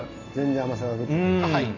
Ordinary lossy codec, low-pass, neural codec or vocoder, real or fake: AAC, 32 kbps; 7.2 kHz; none; real